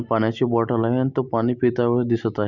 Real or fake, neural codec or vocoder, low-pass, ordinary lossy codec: real; none; 7.2 kHz; none